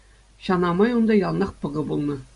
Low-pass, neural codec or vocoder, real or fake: 10.8 kHz; none; real